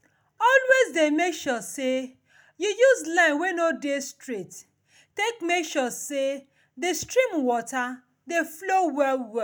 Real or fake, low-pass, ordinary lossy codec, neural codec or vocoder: real; none; none; none